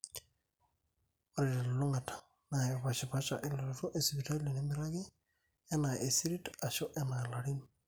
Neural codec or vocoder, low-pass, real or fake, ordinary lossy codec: none; none; real; none